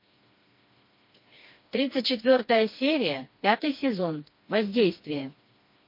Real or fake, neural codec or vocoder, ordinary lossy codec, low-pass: fake; codec, 16 kHz, 2 kbps, FreqCodec, smaller model; MP3, 32 kbps; 5.4 kHz